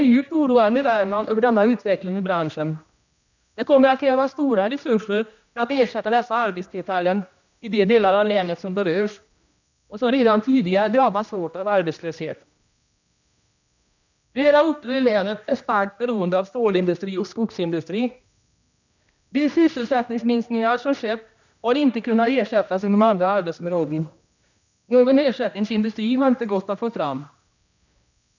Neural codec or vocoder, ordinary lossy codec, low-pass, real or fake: codec, 16 kHz, 1 kbps, X-Codec, HuBERT features, trained on general audio; none; 7.2 kHz; fake